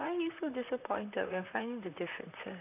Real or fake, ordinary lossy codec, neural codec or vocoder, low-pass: fake; none; vocoder, 44.1 kHz, 128 mel bands, Pupu-Vocoder; 3.6 kHz